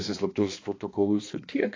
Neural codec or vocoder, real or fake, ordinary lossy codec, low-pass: codec, 16 kHz, 2 kbps, X-Codec, HuBERT features, trained on balanced general audio; fake; AAC, 32 kbps; 7.2 kHz